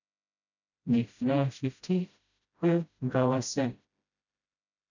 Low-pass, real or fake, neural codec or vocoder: 7.2 kHz; fake; codec, 16 kHz, 0.5 kbps, FreqCodec, smaller model